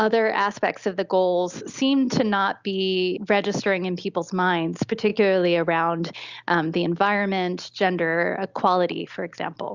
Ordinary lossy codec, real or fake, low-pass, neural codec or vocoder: Opus, 64 kbps; real; 7.2 kHz; none